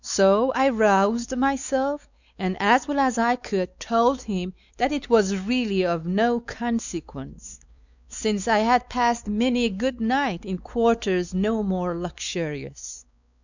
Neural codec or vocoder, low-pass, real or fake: codec, 16 kHz, 4 kbps, X-Codec, WavLM features, trained on Multilingual LibriSpeech; 7.2 kHz; fake